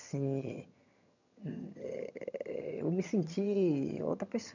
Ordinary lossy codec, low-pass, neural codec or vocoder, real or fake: none; 7.2 kHz; vocoder, 22.05 kHz, 80 mel bands, HiFi-GAN; fake